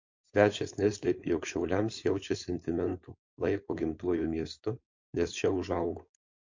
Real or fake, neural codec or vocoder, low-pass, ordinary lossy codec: fake; codec, 16 kHz, 4.8 kbps, FACodec; 7.2 kHz; MP3, 48 kbps